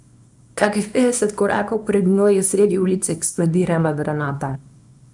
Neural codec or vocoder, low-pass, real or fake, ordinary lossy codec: codec, 24 kHz, 0.9 kbps, WavTokenizer, small release; 10.8 kHz; fake; none